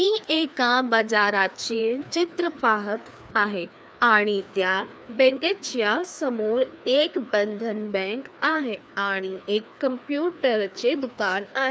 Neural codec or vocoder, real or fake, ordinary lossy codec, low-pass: codec, 16 kHz, 2 kbps, FreqCodec, larger model; fake; none; none